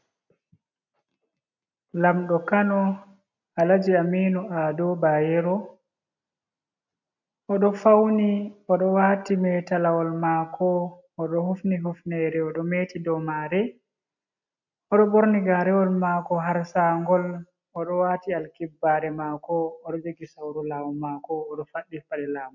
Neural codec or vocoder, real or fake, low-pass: none; real; 7.2 kHz